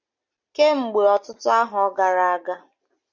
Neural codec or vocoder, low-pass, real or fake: none; 7.2 kHz; real